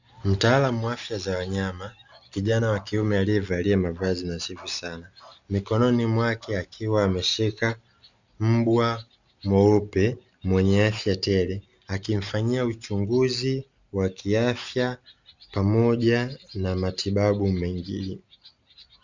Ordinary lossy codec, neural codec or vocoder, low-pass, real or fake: Opus, 64 kbps; none; 7.2 kHz; real